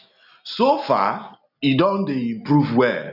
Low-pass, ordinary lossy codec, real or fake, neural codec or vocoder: 5.4 kHz; none; real; none